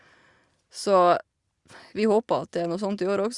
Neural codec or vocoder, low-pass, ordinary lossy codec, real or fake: none; 10.8 kHz; Opus, 64 kbps; real